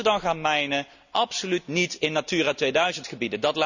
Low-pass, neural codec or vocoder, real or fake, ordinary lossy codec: 7.2 kHz; none; real; none